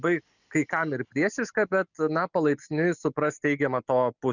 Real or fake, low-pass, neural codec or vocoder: real; 7.2 kHz; none